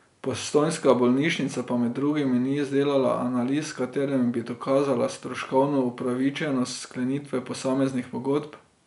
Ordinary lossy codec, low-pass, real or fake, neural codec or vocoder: none; 10.8 kHz; real; none